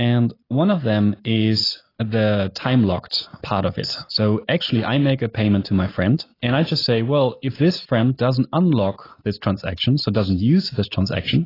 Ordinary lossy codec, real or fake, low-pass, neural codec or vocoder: AAC, 24 kbps; real; 5.4 kHz; none